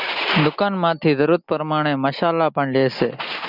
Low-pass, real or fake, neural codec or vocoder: 5.4 kHz; real; none